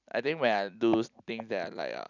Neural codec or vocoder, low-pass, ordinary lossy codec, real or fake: vocoder, 44.1 kHz, 128 mel bands every 256 samples, BigVGAN v2; 7.2 kHz; none; fake